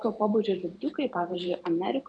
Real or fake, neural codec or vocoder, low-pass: fake; vocoder, 44.1 kHz, 128 mel bands every 512 samples, BigVGAN v2; 9.9 kHz